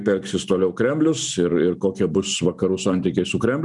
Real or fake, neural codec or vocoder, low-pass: real; none; 10.8 kHz